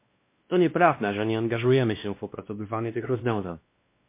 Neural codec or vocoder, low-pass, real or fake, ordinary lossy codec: codec, 16 kHz, 1 kbps, X-Codec, WavLM features, trained on Multilingual LibriSpeech; 3.6 kHz; fake; MP3, 24 kbps